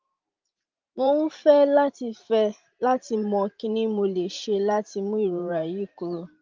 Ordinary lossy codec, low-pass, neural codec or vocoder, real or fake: Opus, 32 kbps; 7.2 kHz; vocoder, 44.1 kHz, 128 mel bands every 512 samples, BigVGAN v2; fake